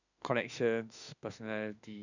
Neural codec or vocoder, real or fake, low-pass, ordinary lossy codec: autoencoder, 48 kHz, 32 numbers a frame, DAC-VAE, trained on Japanese speech; fake; 7.2 kHz; none